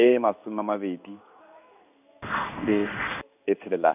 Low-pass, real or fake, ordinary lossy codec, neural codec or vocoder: 3.6 kHz; fake; none; codec, 16 kHz in and 24 kHz out, 1 kbps, XY-Tokenizer